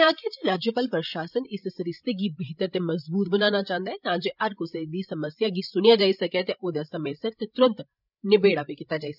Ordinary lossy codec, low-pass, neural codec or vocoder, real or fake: none; 5.4 kHz; codec, 16 kHz, 16 kbps, FreqCodec, larger model; fake